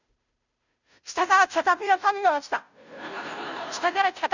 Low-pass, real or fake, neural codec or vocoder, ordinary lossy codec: 7.2 kHz; fake; codec, 16 kHz, 0.5 kbps, FunCodec, trained on Chinese and English, 25 frames a second; none